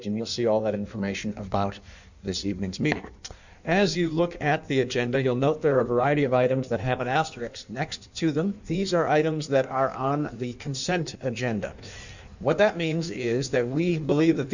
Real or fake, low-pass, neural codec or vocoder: fake; 7.2 kHz; codec, 16 kHz in and 24 kHz out, 1.1 kbps, FireRedTTS-2 codec